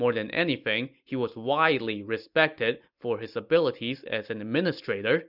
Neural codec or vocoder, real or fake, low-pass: none; real; 5.4 kHz